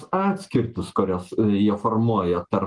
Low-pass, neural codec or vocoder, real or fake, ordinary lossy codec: 10.8 kHz; none; real; Opus, 24 kbps